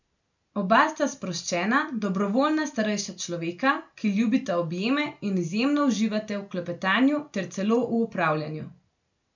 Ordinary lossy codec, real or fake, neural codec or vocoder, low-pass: none; real; none; 7.2 kHz